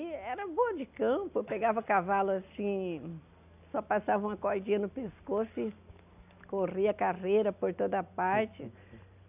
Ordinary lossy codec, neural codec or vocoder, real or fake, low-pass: none; none; real; 3.6 kHz